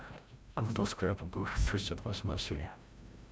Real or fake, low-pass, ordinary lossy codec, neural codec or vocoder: fake; none; none; codec, 16 kHz, 0.5 kbps, FreqCodec, larger model